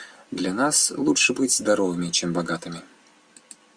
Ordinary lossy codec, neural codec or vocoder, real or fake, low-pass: Opus, 64 kbps; none; real; 9.9 kHz